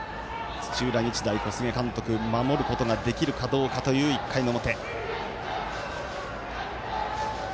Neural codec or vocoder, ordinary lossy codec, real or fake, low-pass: none; none; real; none